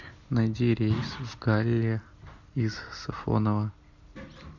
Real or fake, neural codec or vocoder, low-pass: real; none; 7.2 kHz